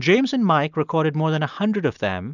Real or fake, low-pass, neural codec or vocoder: real; 7.2 kHz; none